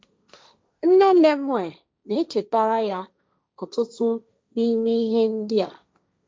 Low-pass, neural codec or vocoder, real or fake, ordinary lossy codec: none; codec, 16 kHz, 1.1 kbps, Voila-Tokenizer; fake; none